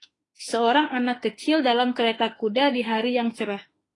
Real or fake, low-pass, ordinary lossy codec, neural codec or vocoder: fake; 10.8 kHz; AAC, 32 kbps; autoencoder, 48 kHz, 32 numbers a frame, DAC-VAE, trained on Japanese speech